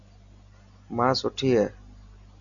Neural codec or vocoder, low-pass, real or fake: none; 7.2 kHz; real